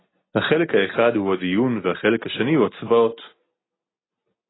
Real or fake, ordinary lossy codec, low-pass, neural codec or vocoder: real; AAC, 16 kbps; 7.2 kHz; none